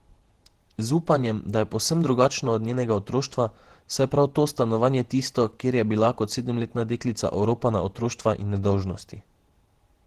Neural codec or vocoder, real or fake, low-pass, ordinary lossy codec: vocoder, 48 kHz, 128 mel bands, Vocos; fake; 14.4 kHz; Opus, 16 kbps